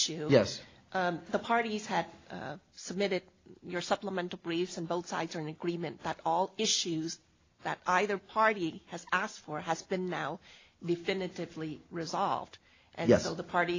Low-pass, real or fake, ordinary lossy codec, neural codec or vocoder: 7.2 kHz; real; AAC, 32 kbps; none